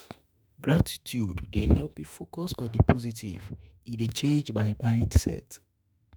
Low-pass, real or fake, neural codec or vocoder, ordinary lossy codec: none; fake; autoencoder, 48 kHz, 32 numbers a frame, DAC-VAE, trained on Japanese speech; none